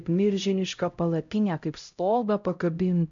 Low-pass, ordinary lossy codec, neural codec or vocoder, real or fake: 7.2 kHz; MP3, 64 kbps; codec, 16 kHz, 0.5 kbps, X-Codec, HuBERT features, trained on LibriSpeech; fake